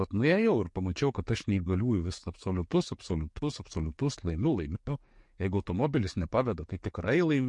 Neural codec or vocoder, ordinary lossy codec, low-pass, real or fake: codec, 44.1 kHz, 7.8 kbps, DAC; MP3, 48 kbps; 10.8 kHz; fake